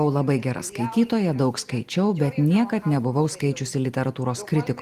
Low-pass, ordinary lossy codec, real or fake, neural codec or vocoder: 14.4 kHz; Opus, 24 kbps; real; none